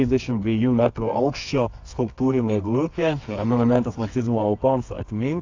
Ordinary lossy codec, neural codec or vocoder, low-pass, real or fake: AAC, 48 kbps; codec, 24 kHz, 0.9 kbps, WavTokenizer, medium music audio release; 7.2 kHz; fake